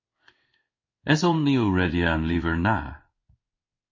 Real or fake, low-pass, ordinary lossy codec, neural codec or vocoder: fake; 7.2 kHz; MP3, 32 kbps; codec, 16 kHz in and 24 kHz out, 1 kbps, XY-Tokenizer